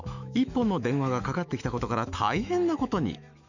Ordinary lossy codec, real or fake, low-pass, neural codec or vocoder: none; fake; 7.2 kHz; autoencoder, 48 kHz, 128 numbers a frame, DAC-VAE, trained on Japanese speech